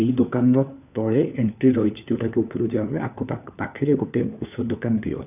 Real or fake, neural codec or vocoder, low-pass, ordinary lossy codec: fake; codec, 16 kHz, 4 kbps, FunCodec, trained on LibriTTS, 50 frames a second; 3.6 kHz; none